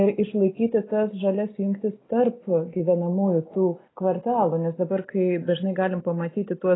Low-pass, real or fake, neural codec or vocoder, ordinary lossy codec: 7.2 kHz; real; none; AAC, 16 kbps